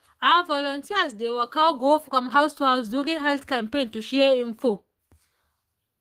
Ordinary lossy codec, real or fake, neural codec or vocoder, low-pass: Opus, 24 kbps; fake; codec, 32 kHz, 1.9 kbps, SNAC; 14.4 kHz